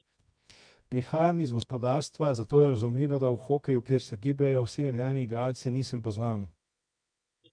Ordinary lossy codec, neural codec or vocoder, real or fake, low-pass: MP3, 64 kbps; codec, 24 kHz, 0.9 kbps, WavTokenizer, medium music audio release; fake; 9.9 kHz